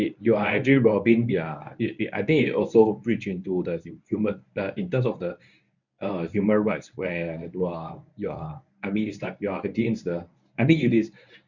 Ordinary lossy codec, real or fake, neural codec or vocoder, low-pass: none; fake; codec, 24 kHz, 0.9 kbps, WavTokenizer, medium speech release version 1; 7.2 kHz